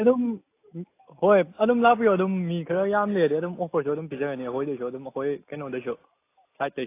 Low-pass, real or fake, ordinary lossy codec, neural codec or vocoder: 3.6 kHz; real; AAC, 24 kbps; none